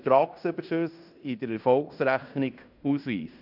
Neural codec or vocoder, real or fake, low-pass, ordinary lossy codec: codec, 16 kHz in and 24 kHz out, 0.9 kbps, LongCat-Audio-Codec, fine tuned four codebook decoder; fake; 5.4 kHz; none